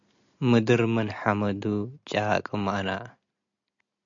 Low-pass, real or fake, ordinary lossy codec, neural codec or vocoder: 7.2 kHz; real; MP3, 64 kbps; none